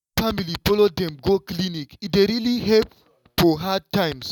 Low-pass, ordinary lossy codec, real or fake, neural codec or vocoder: 19.8 kHz; none; real; none